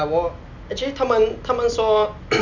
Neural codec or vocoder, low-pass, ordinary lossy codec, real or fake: none; 7.2 kHz; none; real